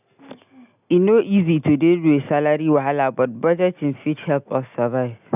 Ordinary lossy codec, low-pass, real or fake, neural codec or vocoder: none; 3.6 kHz; real; none